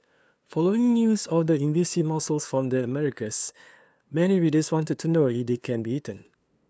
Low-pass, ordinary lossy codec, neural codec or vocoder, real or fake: none; none; codec, 16 kHz, 2 kbps, FunCodec, trained on LibriTTS, 25 frames a second; fake